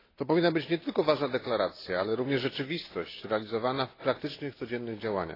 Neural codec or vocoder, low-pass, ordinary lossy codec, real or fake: none; 5.4 kHz; AAC, 24 kbps; real